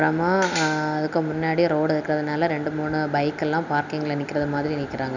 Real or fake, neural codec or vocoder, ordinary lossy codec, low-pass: real; none; none; 7.2 kHz